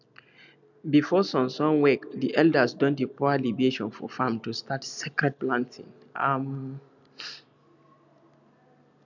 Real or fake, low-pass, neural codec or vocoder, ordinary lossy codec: real; 7.2 kHz; none; none